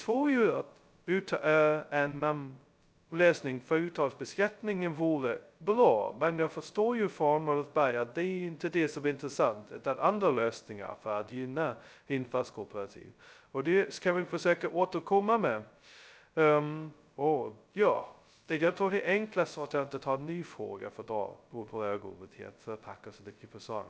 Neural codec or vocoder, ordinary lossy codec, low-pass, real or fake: codec, 16 kHz, 0.2 kbps, FocalCodec; none; none; fake